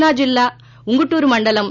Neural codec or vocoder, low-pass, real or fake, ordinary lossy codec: none; 7.2 kHz; real; none